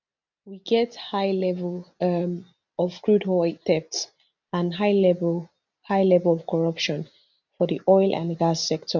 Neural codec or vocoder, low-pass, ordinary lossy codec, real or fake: none; 7.2 kHz; none; real